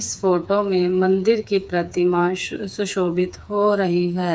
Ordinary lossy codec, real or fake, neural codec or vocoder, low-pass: none; fake; codec, 16 kHz, 4 kbps, FreqCodec, smaller model; none